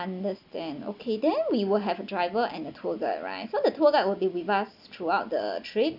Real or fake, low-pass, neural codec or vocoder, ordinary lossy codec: real; 5.4 kHz; none; none